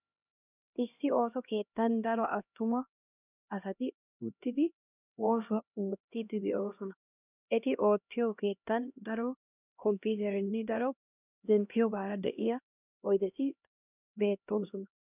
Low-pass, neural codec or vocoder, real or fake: 3.6 kHz; codec, 16 kHz, 1 kbps, X-Codec, HuBERT features, trained on LibriSpeech; fake